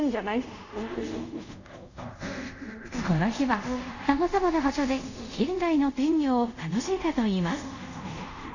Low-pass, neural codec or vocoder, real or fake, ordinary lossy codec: 7.2 kHz; codec, 24 kHz, 0.5 kbps, DualCodec; fake; none